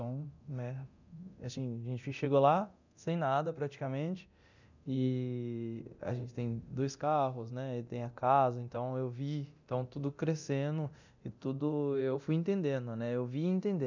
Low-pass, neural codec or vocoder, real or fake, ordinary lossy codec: 7.2 kHz; codec, 24 kHz, 0.9 kbps, DualCodec; fake; none